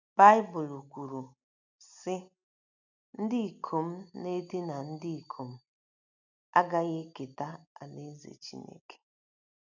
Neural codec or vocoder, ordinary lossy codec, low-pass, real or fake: none; none; 7.2 kHz; real